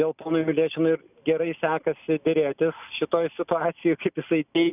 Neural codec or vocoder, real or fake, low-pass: none; real; 3.6 kHz